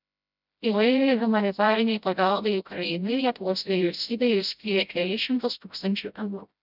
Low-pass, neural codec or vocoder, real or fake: 5.4 kHz; codec, 16 kHz, 0.5 kbps, FreqCodec, smaller model; fake